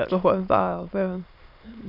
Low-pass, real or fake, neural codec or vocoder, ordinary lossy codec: 5.4 kHz; fake; autoencoder, 22.05 kHz, a latent of 192 numbers a frame, VITS, trained on many speakers; none